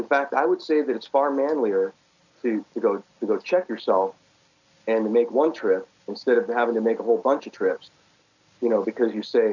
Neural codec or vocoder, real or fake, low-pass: none; real; 7.2 kHz